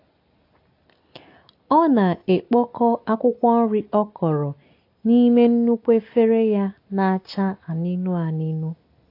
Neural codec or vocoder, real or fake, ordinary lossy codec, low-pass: none; real; AAC, 32 kbps; 5.4 kHz